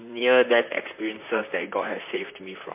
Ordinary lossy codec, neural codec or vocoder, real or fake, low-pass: MP3, 24 kbps; vocoder, 44.1 kHz, 128 mel bands, Pupu-Vocoder; fake; 3.6 kHz